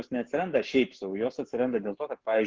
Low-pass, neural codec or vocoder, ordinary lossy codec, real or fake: 7.2 kHz; codec, 44.1 kHz, 7.8 kbps, Pupu-Codec; Opus, 16 kbps; fake